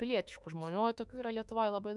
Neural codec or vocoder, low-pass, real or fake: autoencoder, 48 kHz, 32 numbers a frame, DAC-VAE, trained on Japanese speech; 10.8 kHz; fake